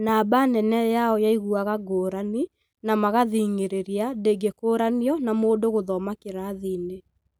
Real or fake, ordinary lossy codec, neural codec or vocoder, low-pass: real; none; none; none